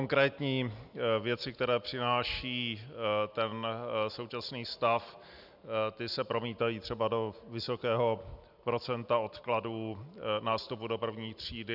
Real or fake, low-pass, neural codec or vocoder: real; 5.4 kHz; none